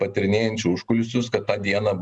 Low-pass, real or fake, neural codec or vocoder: 10.8 kHz; real; none